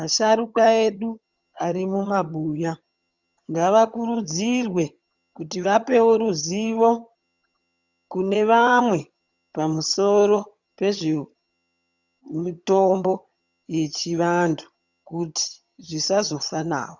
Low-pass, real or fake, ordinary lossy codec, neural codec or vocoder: 7.2 kHz; fake; Opus, 64 kbps; vocoder, 22.05 kHz, 80 mel bands, HiFi-GAN